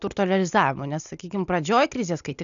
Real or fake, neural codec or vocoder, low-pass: real; none; 7.2 kHz